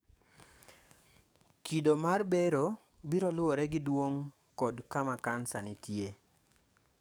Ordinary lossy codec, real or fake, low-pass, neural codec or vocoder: none; fake; none; codec, 44.1 kHz, 7.8 kbps, DAC